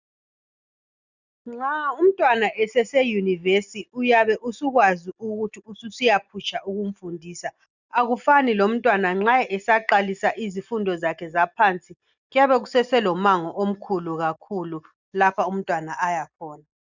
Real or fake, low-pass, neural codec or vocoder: real; 7.2 kHz; none